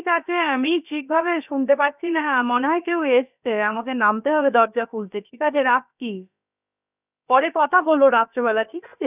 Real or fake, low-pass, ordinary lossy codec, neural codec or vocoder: fake; 3.6 kHz; none; codec, 16 kHz, about 1 kbps, DyCAST, with the encoder's durations